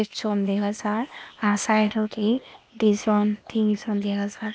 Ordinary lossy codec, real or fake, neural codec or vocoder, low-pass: none; fake; codec, 16 kHz, 0.8 kbps, ZipCodec; none